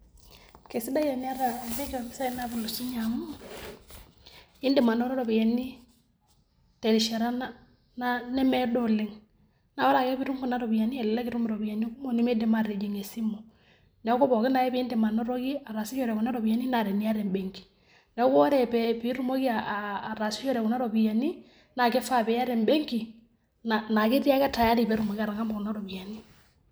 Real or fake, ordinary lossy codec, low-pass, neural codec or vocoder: real; none; none; none